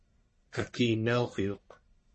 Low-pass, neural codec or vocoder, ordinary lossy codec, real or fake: 10.8 kHz; codec, 44.1 kHz, 1.7 kbps, Pupu-Codec; MP3, 32 kbps; fake